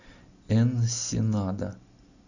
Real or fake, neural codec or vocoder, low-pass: real; none; 7.2 kHz